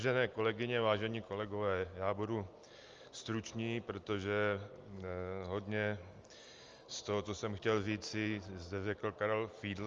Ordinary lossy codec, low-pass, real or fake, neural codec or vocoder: Opus, 32 kbps; 7.2 kHz; real; none